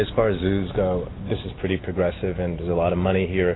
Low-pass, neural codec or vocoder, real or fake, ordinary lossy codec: 7.2 kHz; none; real; AAC, 16 kbps